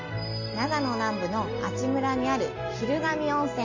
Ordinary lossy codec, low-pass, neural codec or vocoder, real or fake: MP3, 32 kbps; 7.2 kHz; none; real